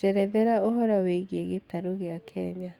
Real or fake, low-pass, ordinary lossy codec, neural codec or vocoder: fake; 19.8 kHz; Opus, 64 kbps; codec, 44.1 kHz, 7.8 kbps, DAC